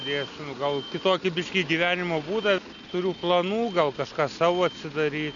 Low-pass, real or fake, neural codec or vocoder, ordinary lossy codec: 7.2 kHz; real; none; MP3, 96 kbps